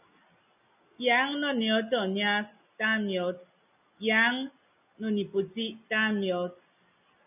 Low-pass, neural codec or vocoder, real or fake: 3.6 kHz; none; real